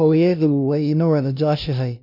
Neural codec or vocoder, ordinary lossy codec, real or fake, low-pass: codec, 16 kHz, 0.5 kbps, FunCodec, trained on LibriTTS, 25 frames a second; none; fake; 5.4 kHz